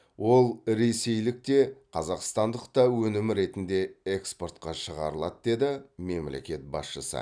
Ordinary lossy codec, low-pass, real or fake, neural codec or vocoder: none; 9.9 kHz; real; none